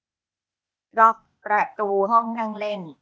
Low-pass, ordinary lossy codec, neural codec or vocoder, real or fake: none; none; codec, 16 kHz, 0.8 kbps, ZipCodec; fake